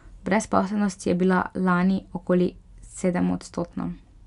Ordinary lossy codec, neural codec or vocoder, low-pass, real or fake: none; none; 10.8 kHz; real